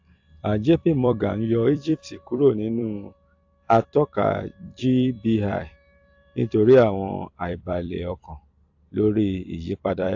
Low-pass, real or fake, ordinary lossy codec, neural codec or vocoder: 7.2 kHz; fake; AAC, 48 kbps; vocoder, 44.1 kHz, 128 mel bands every 256 samples, BigVGAN v2